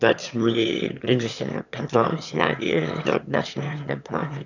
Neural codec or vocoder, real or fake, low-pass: autoencoder, 22.05 kHz, a latent of 192 numbers a frame, VITS, trained on one speaker; fake; 7.2 kHz